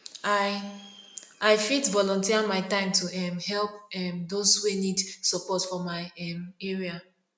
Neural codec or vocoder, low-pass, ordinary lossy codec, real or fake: none; none; none; real